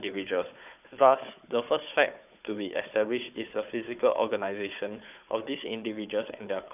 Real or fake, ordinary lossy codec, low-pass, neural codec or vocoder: fake; none; 3.6 kHz; codec, 16 kHz, 4 kbps, FunCodec, trained on Chinese and English, 50 frames a second